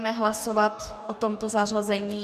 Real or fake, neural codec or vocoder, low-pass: fake; codec, 44.1 kHz, 2.6 kbps, DAC; 14.4 kHz